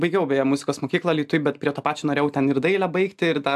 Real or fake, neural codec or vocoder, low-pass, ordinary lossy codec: real; none; 14.4 kHz; AAC, 96 kbps